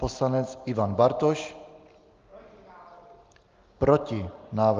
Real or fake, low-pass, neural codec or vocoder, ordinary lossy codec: real; 7.2 kHz; none; Opus, 32 kbps